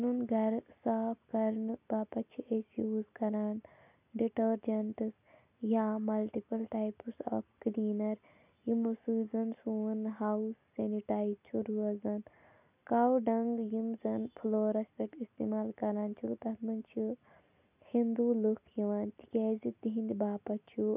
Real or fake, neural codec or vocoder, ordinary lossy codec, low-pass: real; none; none; 3.6 kHz